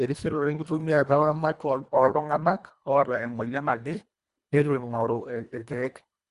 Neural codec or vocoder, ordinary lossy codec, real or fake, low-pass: codec, 24 kHz, 1.5 kbps, HILCodec; Opus, 64 kbps; fake; 10.8 kHz